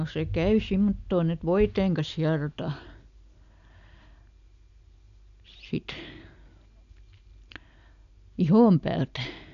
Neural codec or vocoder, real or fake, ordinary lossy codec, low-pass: none; real; none; 7.2 kHz